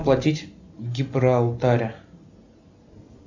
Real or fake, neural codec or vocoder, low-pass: real; none; 7.2 kHz